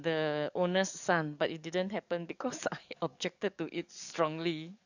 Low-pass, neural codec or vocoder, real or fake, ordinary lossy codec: 7.2 kHz; codec, 44.1 kHz, 7.8 kbps, DAC; fake; none